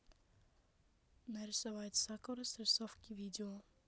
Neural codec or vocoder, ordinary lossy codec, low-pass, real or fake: none; none; none; real